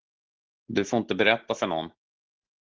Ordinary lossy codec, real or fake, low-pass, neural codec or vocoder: Opus, 16 kbps; real; 7.2 kHz; none